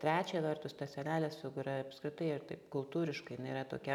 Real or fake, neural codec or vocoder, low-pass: real; none; 19.8 kHz